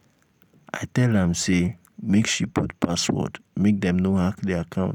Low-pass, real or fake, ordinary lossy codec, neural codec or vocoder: 19.8 kHz; real; none; none